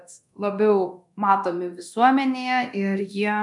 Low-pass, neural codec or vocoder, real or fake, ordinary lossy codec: 10.8 kHz; codec, 24 kHz, 0.9 kbps, DualCodec; fake; MP3, 96 kbps